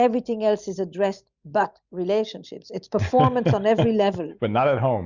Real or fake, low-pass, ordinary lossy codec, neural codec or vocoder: real; 7.2 kHz; Opus, 64 kbps; none